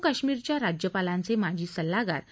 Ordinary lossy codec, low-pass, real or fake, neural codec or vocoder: none; none; real; none